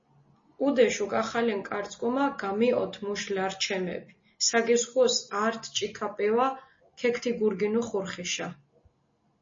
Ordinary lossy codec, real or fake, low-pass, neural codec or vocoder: MP3, 32 kbps; real; 7.2 kHz; none